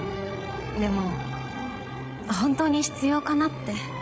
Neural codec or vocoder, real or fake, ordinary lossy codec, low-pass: codec, 16 kHz, 16 kbps, FreqCodec, larger model; fake; none; none